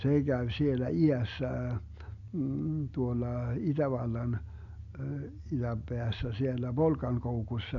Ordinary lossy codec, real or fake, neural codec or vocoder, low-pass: none; real; none; 7.2 kHz